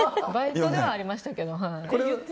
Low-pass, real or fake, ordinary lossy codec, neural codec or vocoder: none; real; none; none